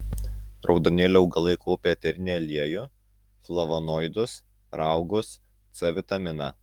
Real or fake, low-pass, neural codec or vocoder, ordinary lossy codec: fake; 19.8 kHz; codec, 44.1 kHz, 7.8 kbps, DAC; Opus, 32 kbps